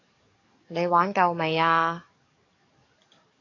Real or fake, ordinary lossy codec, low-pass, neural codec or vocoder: fake; AAC, 32 kbps; 7.2 kHz; codec, 44.1 kHz, 7.8 kbps, DAC